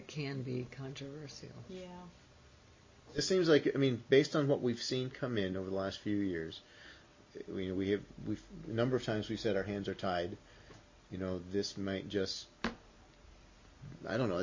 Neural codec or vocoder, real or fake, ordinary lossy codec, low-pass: none; real; MP3, 32 kbps; 7.2 kHz